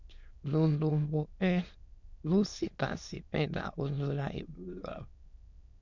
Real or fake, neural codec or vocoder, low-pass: fake; autoencoder, 22.05 kHz, a latent of 192 numbers a frame, VITS, trained on many speakers; 7.2 kHz